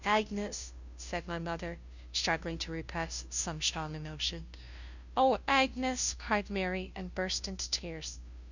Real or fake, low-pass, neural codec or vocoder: fake; 7.2 kHz; codec, 16 kHz, 0.5 kbps, FunCodec, trained on Chinese and English, 25 frames a second